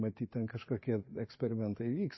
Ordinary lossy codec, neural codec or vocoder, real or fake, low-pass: MP3, 24 kbps; none; real; 7.2 kHz